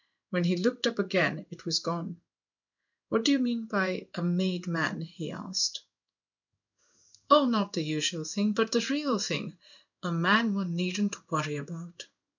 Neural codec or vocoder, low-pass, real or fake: codec, 16 kHz in and 24 kHz out, 1 kbps, XY-Tokenizer; 7.2 kHz; fake